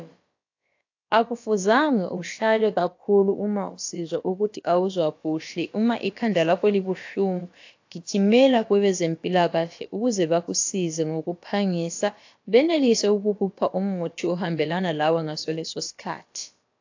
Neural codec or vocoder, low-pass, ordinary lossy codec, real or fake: codec, 16 kHz, about 1 kbps, DyCAST, with the encoder's durations; 7.2 kHz; AAC, 48 kbps; fake